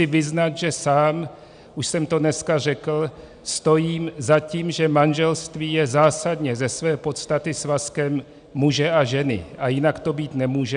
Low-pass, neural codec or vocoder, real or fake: 9.9 kHz; none; real